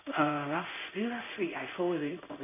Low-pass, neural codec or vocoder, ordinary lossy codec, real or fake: 3.6 kHz; codec, 16 kHz in and 24 kHz out, 1 kbps, XY-Tokenizer; none; fake